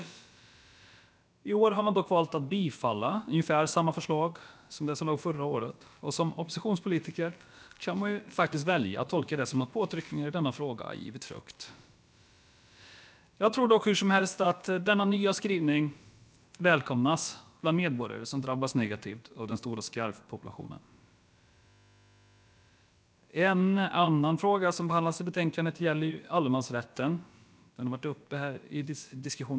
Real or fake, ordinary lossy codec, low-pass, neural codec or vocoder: fake; none; none; codec, 16 kHz, about 1 kbps, DyCAST, with the encoder's durations